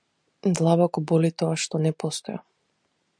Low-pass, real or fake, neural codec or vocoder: 9.9 kHz; real; none